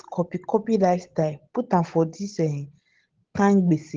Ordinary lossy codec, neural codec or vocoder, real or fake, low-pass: Opus, 16 kbps; none; real; 7.2 kHz